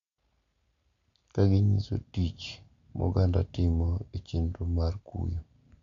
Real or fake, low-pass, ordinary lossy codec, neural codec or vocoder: real; 7.2 kHz; AAC, 48 kbps; none